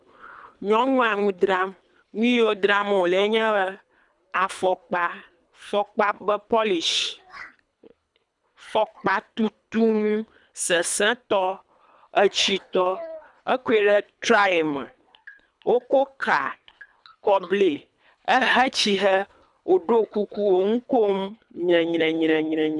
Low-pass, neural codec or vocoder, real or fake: 10.8 kHz; codec, 24 kHz, 3 kbps, HILCodec; fake